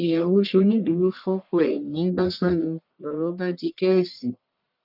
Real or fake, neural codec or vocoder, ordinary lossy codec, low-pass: fake; codec, 44.1 kHz, 1.7 kbps, Pupu-Codec; none; 5.4 kHz